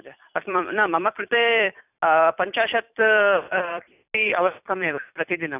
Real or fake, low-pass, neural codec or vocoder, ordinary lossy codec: fake; 3.6 kHz; vocoder, 44.1 kHz, 128 mel bands every 256 samples, BigVGAN v2; none